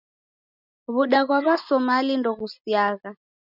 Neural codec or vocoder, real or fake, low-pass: none; real; 5.4 kHz